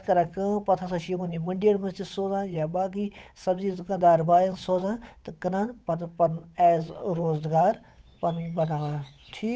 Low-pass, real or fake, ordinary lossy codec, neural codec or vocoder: none; fake; none; codec, 16 kHz, 8 kbps, FunCodec, trained on Chinese and English, 25 frames a second